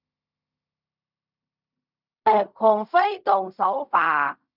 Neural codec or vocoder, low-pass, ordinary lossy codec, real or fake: codec, 16 kHz in and 24 kHz out, 0.4 kbps, LongCat-Audio-Codec, fine tuned four codebook decoder; 5.4 kHz; none; fake